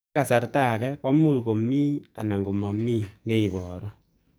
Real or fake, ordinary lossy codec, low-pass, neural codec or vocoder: fake; none; none; codec, 44.1 kHz, 2.6 kbps, SNAC